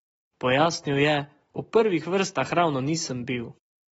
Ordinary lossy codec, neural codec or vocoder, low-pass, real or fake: AAC, 24 kbps; none; 19.8 kHz; real